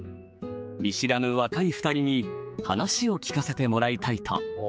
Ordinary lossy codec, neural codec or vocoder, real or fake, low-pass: none; codec, 16 kHz, 4 kbps, X-Codec, HuBERT features, trained on general audio; fake; none